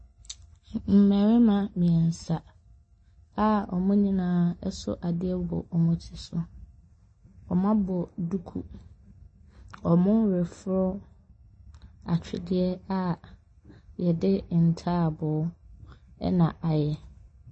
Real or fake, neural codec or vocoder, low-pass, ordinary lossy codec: real; none; 9.9 kHz; MP3, 32 kbps